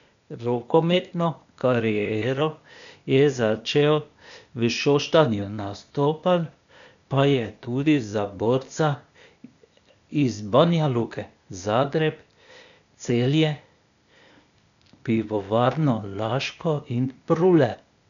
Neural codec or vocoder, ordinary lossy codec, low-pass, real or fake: codec, 16 kHz, 0.8 kbps, ZipCodec; none; 7.2 kHz; fake